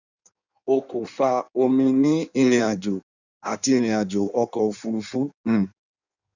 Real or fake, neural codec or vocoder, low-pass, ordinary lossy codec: fake; codec, 16 kHz in and 24 kHz out, 1.1 kbps, FireRedTTS-2 codec; 7.2 kHz; none